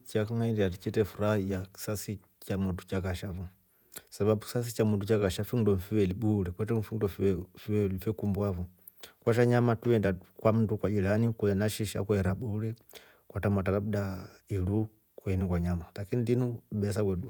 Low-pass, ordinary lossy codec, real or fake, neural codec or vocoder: none; none; fake; vocoder, 48 kHz, 128 mel bands, Vocos